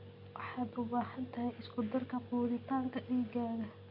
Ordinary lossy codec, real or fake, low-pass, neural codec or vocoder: Opus, 64 kbps; real; 5.4 kHz; none